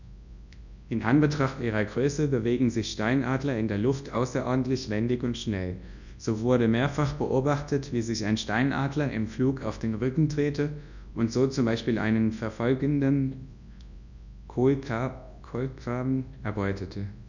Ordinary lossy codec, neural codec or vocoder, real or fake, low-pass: none; codec, 24 kHz, 0.9 kbps, WavTokenizer, large speech release; fake; 7.2 kHz